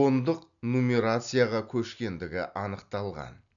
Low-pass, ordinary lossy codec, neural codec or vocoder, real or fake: 7.2 kHz; none; none; real